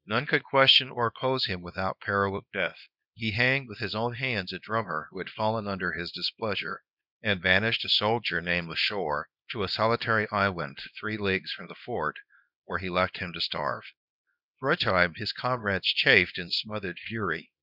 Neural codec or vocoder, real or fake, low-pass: codec, 24 kHz, 0.9 kbps, WavTokenizer, small release; fake; 5.4 kHz